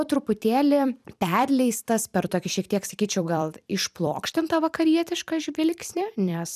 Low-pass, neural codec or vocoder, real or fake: 14.4 kHz; vocoder, 44.1 kHz, 128 mel bands every 256 samples, BigVGAN v2; fake